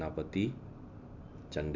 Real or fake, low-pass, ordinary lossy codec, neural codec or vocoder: real; 7.2 kHz; none; none